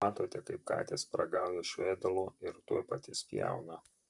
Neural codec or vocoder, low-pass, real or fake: vocoder, 44.1 kHz, 128 mel bands, Pupu-Vocoder; 10.8 kHz; fake